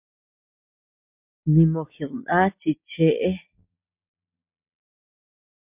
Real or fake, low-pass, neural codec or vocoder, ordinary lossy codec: real; 3.6 kHz; none; MP3, 32 kbps